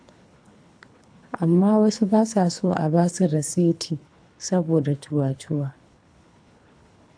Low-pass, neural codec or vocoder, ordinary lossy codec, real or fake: 9.9 kHz; codec, 24 kHz, 3 kbps, HILCodec; AAC, 64 kbps; fake